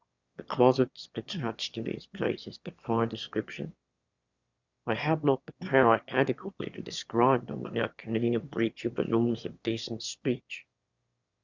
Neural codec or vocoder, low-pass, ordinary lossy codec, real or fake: autoencoder, 22.05 kHz, a latent of 192 numbers a frame, VITS, trained on one speaker; 7.2 kHz; Opus, 64 kbps; fake